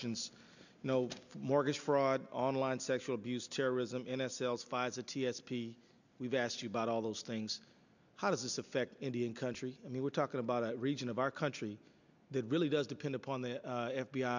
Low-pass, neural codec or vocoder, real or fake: 7.2 kHz; none; real